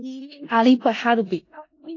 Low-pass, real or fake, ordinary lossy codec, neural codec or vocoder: 7.2 kHz; fake; AAC, 32 kbps; codec, 16 kHz in and 24 kHz out, 0.4 kbps, LongCat-Audio-Codec, four codebook decoder